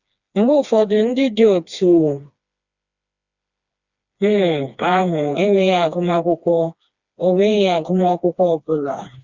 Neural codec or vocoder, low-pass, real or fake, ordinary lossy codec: codec, 16 kHz, 2 kbps, FreqCodec, smaller model; 7.2 kHz; fake; Opus, 64 kbps